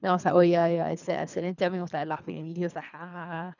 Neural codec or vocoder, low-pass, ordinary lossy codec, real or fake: codec, 24 kHz, 3 kbps, HILCodec; 7.2 kHz; none; fake